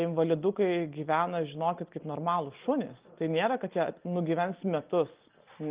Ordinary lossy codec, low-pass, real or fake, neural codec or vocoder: Opus, 32 kbps; 3.6 kHz; real; none